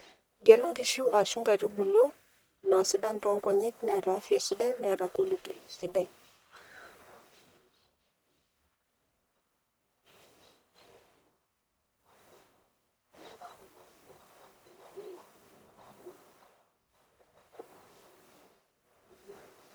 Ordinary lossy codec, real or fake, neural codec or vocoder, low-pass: none; fake; codec, 44.1 kHz, 1.7 kbps, Pupu-Codec; none